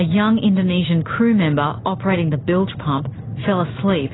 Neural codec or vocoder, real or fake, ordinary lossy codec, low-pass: none; real; AAC, 16 kbps; 7.2 kHz